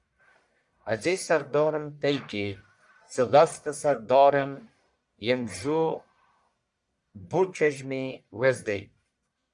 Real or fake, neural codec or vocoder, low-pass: fake; codec, 44.1 kHz, 1.7 kbps, Pupu-Codec; 10.8 kHz